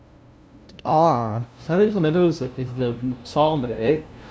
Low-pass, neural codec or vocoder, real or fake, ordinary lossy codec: none; codec, 16 kHz, 0.5 kbps, FunCodec, trained on LibriTTS, 25 frames a second; fake; none